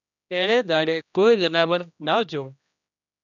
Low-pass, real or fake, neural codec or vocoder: 7.2 kHz; fake; codec, 16 kHz, 1 kbps, X-Codec, HuBERT features, trained on general audio